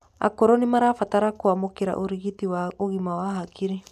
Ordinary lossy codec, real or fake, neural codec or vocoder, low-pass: none; real; none; 14.4 kHz